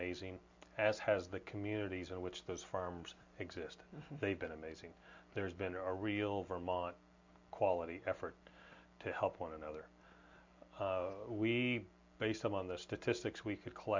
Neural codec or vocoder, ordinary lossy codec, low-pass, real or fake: none; MP3, 64 kbps; 7.2 kHz; real